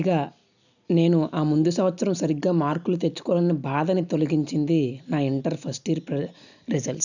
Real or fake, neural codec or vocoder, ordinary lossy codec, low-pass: real; none; none; 7.2 kHz